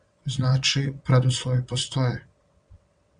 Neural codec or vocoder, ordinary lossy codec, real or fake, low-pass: vocoder, 22.05 kHz, 80 mel bands, WaveNeXt; Opus, 64 kbps; fake; 9.9 kHz